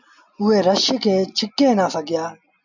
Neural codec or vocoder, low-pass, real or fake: none; 7.2 kHz; real